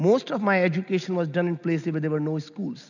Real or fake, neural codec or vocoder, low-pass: real; none; 7.2 kHz